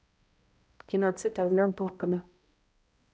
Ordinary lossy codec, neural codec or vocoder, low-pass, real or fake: none; codec, 16 kHz, 0.5 kbps, X-Codec, HuBERT features, trained on balanced general audio; none; fake